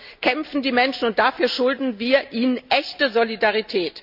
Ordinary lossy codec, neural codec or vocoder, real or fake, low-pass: none; none; real; 5.4 kHz